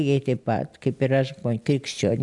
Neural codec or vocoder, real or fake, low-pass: none; real; 10.8 kHz